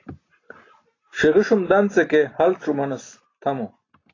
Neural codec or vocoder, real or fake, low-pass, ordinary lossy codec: none; real; 7.2 kHz; AAC, 32 kbps